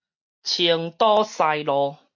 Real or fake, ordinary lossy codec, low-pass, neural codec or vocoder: real; MP3, 48 kbps; 7.2 kHz; none